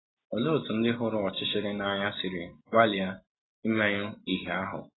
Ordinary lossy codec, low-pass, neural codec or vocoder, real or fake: AAC, 16 kbps; 7.2 kHz; none; real